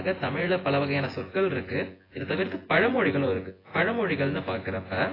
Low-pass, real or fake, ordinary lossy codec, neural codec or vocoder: 5.4 kHz; fake; AAC, 24 kbps; vocoder, 24 kHz, 100 mel bands, Vocos